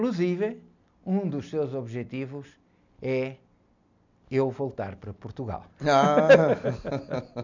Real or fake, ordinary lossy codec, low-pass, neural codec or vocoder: real; none; 7.2 kHz; none